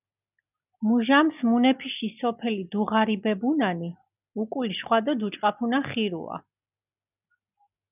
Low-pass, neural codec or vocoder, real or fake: 3.6 kHz; none; real